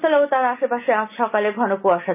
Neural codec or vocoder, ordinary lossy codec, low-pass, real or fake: none; none; 3.6 kHz; real